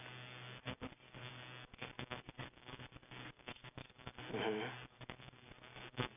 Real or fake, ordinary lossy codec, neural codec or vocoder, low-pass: real; none; none; 3.6 kHz